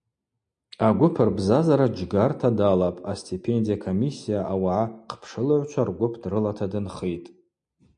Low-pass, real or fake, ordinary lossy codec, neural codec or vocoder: 9.9 kHz; real; MP3, 64 kbps; none